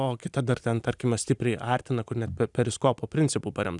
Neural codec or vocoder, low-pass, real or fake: none; 10.8 kHz; real